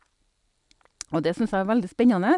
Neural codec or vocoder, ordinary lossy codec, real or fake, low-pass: none; none; real; 10.8 kHz